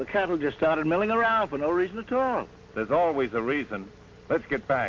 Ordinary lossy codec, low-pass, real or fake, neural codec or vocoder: Opus, 24 kbps; 7.2 kHz; real; none